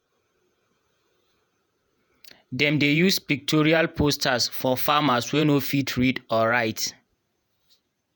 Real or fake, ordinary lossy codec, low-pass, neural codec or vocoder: fake; none; none; vocoder, 48 kHz, 128 mel bands, Vocos